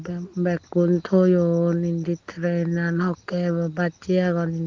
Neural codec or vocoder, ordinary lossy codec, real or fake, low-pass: none; Opus, 16 kbps; real; 7.2 kHz